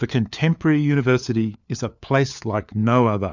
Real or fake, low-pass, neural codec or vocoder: fake; 7.2 kHz; codec, 16 kHz, 4 kbps, FunCodec, trained on LibriTTS, 50 frames a second